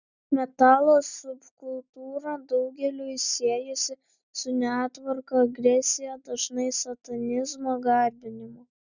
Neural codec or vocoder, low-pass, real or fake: none; 7.2 kHz; real